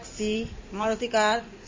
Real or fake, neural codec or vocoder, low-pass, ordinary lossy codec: fake; codec, 16 kHz in and 24 kHz out, 2.2 kbps, FireRedTTS-2 codec; 7.2 kHz; MP3, 32 kbps